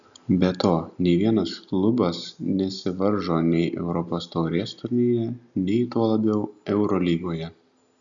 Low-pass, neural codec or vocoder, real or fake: 7.2 kHz; none; real